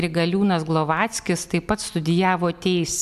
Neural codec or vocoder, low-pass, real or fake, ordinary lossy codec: none; 14.4 kHz; real; MP3, 96 kbps